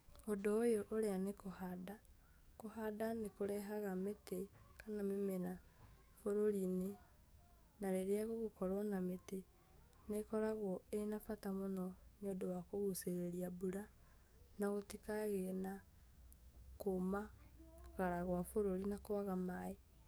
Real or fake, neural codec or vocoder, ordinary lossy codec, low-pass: fake; codec, 44.1 kHz, 7.8 kbps, DAC; none; none